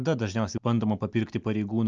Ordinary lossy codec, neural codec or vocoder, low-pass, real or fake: Opus, 24 kbps; none; 7.2 kHz; real